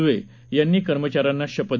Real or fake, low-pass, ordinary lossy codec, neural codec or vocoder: real; 7.2 kHz; none; none